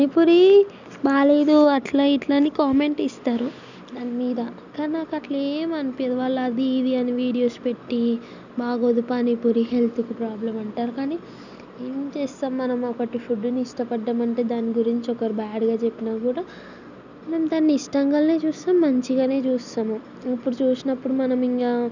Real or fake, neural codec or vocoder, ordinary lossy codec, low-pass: real; none; none; 7.2 kHz